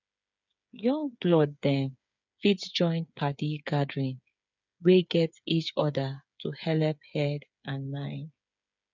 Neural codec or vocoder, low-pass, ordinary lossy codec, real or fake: codec, 16 kHz, 8 kbps, FreqCodec, smaller model; 7.2 kHz; none; fake